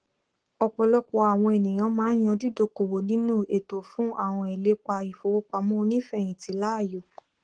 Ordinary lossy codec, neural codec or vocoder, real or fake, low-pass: Opus, 16 kbps; codec, 44.1 kHz, 7.8 kbps, Pupu-Codec; fake; 9.9 kHz